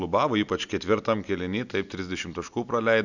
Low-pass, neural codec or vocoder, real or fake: 7.2 kHz; none; real